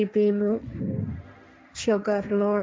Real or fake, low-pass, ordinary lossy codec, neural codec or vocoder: fake; none; none; codec, 16 kHz, 1.1 kbps, Voila-Tokenizer